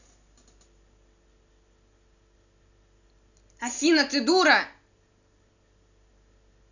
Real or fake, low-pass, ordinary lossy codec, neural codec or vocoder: real; 7.2 kHz; none; none